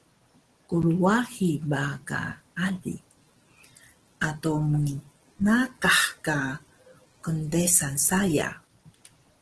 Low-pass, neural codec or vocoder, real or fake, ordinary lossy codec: 10.8 kHz; none; real; Opus, 16 kbps